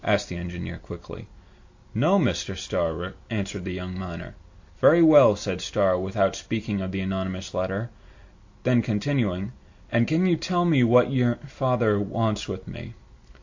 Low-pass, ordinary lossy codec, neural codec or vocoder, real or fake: 7.2 kHz; AAC, 48 kbps; none; real